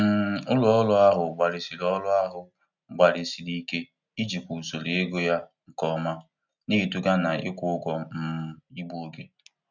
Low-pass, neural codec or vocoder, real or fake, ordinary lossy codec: 7.2 kHz; none; real; none